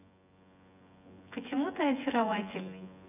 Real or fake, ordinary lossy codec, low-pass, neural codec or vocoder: fake; none; 3.6 kHz; vocoder, 24 kHz, 100 mel bands, Vocos